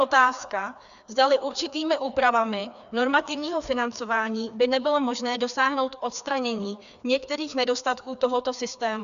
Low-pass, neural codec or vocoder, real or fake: 7.2 kHz; codec, 16 kHz, 2 kbps, FreqCodec, larger model; fake